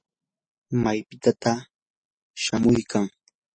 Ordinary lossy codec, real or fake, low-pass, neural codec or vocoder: MP3, 32 kbps; real; 9.9 kHz; none